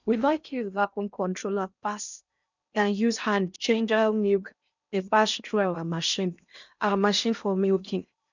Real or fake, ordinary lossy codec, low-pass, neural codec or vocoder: fake; none; 7.2 kHz; codec, 16 kHz in and 24 kHz out, 0.6 kbps, FocalCodec, streaming, 4096 codes